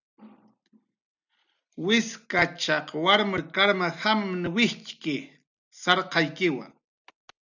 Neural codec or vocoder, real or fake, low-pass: none; real; 7.2 kHz